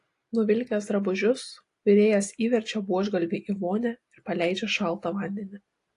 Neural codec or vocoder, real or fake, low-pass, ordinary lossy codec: none; real; 10.8 kHz; AAC, 48 kbps